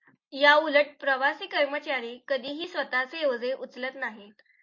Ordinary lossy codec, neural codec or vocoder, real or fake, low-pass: MP3, 32 kbps; none; real; 7.2 kHz